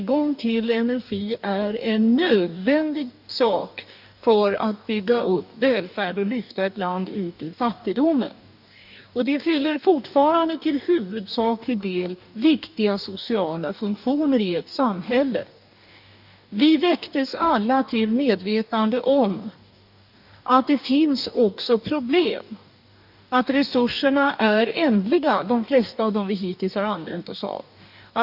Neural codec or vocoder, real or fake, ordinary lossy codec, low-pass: codec, 44.1 kHz, 2.6 kbps, DAC; fake; none; 5.4 kHz